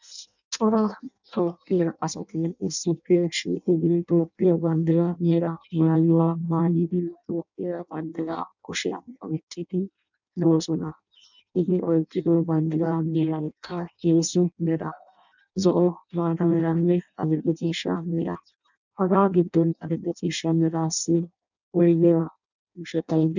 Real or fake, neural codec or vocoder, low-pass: fake; codec, 16 kHz in and 24 kHz out, 0.6 kbps, FireRedTTS-2 codec; 7.2 kHz